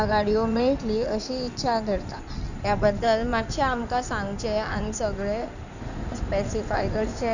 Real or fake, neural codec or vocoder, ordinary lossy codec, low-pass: fake; codec, 16 kHz in and 24 kHz out, 2.2 kbps, FireRedTTS-2 codec; none; 7.2 kHz